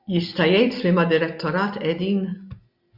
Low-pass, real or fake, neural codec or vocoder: 5.4 kHz; real; none